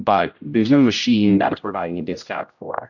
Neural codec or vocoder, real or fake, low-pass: codec, 16 kHz, 0.5 kbps, X-Codec, HuBERT features, trained on general audio; fake; 7.2 kHz